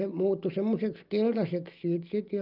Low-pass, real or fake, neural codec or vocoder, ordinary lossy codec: 5.4 kHz; real; none; Opus, 32 kbps